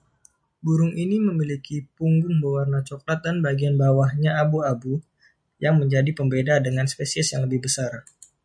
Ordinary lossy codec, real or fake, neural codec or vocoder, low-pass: MP3, 96 kbps; real; none; 9.9 kHz